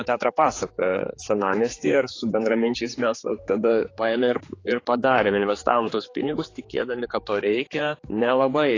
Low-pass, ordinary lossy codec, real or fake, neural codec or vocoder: 7.2 kHz; AAC, 32 kbps; fake; codec, 16 kHz, 4 kbps, X-Codec, HuBERT features, trained on general audio